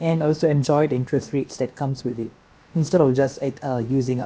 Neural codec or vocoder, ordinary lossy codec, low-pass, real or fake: codec, 16 kHz, about 1 kbps, DyCAST, with the encoder's durations; none; none; fake